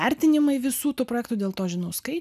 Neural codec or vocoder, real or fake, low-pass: none; real; 14.4 kHz